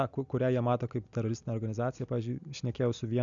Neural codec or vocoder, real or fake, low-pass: none; real; 7.2 kHz